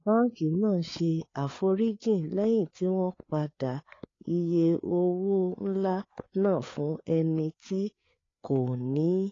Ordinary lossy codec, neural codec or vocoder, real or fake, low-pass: AAC, 32 kbps; codec, 16 kHz, 4 kbps, FreqCodec, larger model; fake; 7.2 kHz